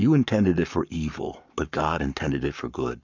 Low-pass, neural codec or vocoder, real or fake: 7.2 kHz; codec, 44.1 kHz, 7.8 kbps, Pupu-Codec; fake